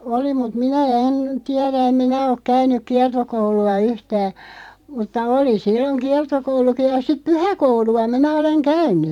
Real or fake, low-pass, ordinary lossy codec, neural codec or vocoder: fake; 19.8 kHz; none; vocoder, 44.1 kHz, 128 mel bands every 512 samples, BigVGAN v2